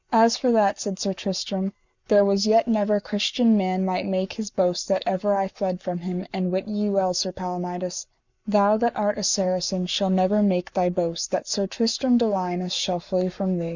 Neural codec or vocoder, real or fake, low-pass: codec, 44.1 kHz, 7.8 kbps, Pupu-Codec; fake; 7.2 kHz